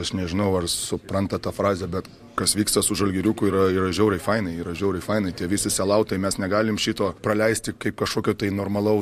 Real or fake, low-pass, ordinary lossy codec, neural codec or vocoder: real; 14.4 kHz; MP3, 64 kbps; none